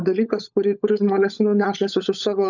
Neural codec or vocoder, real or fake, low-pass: codec, 16 kHz, 16 kbps, FunCodec, trained on LibriTTS, 50 frames a second; fake; 7.2 kHz